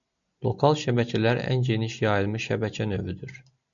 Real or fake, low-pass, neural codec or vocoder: real; 7.2 kHz; none